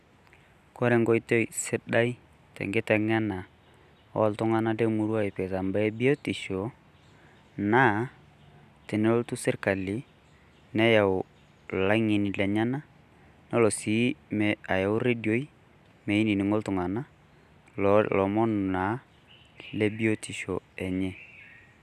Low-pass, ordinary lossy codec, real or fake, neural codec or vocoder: 14.4 kHz; none; real; none